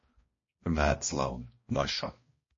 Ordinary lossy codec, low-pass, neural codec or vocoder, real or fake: MP3, 32 kbps; 7.2 kHz; codec, 16 kHz, 1 kbps, X-Codec, HuBERT features, trained on balanced general audio; fake